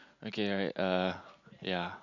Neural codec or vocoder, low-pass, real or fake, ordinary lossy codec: none; 7.2 kHz; real; none